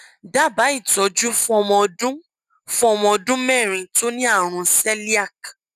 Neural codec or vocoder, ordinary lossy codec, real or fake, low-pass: none; none; real; 14.4 kHz